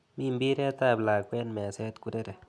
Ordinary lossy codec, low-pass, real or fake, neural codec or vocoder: none; none; real; none